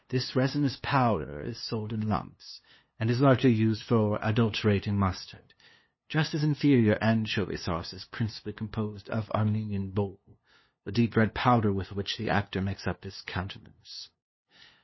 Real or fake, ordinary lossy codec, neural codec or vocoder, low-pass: fake; MP3, 24 kbps; codec, 16 kHz, 2 kbps, FunCodec, trained on LibriTTS, 25 frames a second; 7.2 kHz